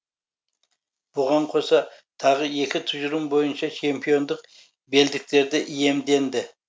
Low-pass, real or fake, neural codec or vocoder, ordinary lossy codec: none; real; none; none